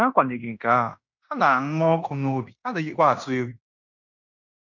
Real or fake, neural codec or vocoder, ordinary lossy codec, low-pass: fake; codec, 16 kHz in and 24 kHz out, 0.9 kbps, LongCat-Audio-Codec, fine tuned four codebook decoder; none; 7.2 kHz